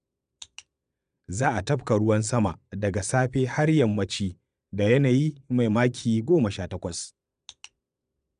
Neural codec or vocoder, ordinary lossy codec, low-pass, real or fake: vocoder, 22.05 kHz, 80 mel bands, Vocos; none; 9.9 kHz; fake